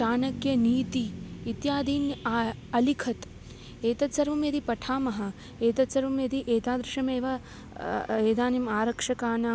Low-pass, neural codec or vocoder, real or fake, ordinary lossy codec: none; none; real; none